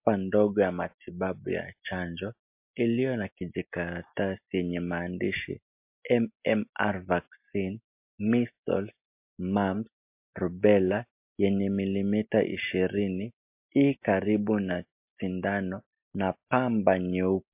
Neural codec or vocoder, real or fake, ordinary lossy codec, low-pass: none; real; MP3, 32 kbps; 3.6 kHz